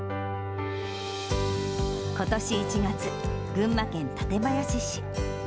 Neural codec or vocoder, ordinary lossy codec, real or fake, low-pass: none; none; real; none